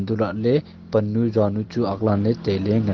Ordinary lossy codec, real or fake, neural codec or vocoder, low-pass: Opus, 16 kbps; real; none; 7.2 kHz